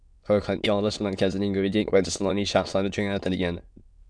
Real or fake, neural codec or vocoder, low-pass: fake; autoencoder, 22.05 kHz, a latent of 192 numbers a frame, VITS, trained on many speakers; 9.9 kHz